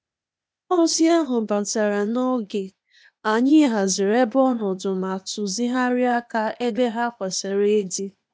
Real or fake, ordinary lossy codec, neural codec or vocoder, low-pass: fake; none; codec, 16 kHz, 0.8 kbps, ZipCodec; none